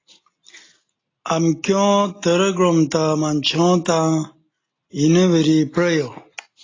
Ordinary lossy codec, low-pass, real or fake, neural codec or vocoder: AAC, 32 kbps; 7.2 kHz; real; none